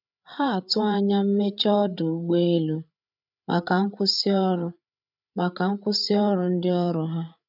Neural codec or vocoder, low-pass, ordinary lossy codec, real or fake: codec, 16 kHz, 8 kbps, FreqCodec, larger model; 5.4 kHz; none; fake